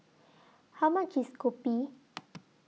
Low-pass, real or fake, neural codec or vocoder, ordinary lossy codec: none; real; none; none